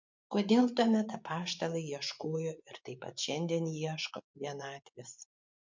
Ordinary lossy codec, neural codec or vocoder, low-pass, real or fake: MP3, 64 kbps; vocoder, 24 kHz, 100 mel bands, Vocos; 7.2 kHz; fake